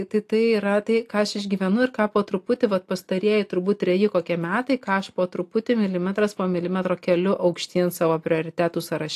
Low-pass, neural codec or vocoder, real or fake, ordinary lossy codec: 14.4 kHz; none; real; AAC, 64 kbps